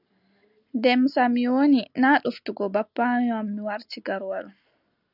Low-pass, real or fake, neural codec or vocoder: 5.4 kHz; real; none